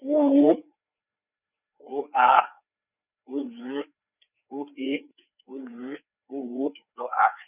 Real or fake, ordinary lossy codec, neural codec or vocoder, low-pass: fake; none; codec, 16 kHz, 4 kbps, FreqCodec, larger model; 3.6 kHz